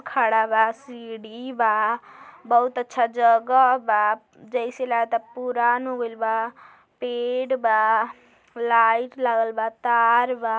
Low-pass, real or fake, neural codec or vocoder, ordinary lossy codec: none; real; none; none